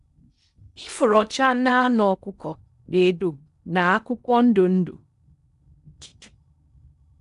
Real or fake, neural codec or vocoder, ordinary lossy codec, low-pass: fake; codec, 16 kHz in and 24 kHz out, 0.8 kbps, FocalCodec, streaming, 65536 codes; none; 10.8 kHz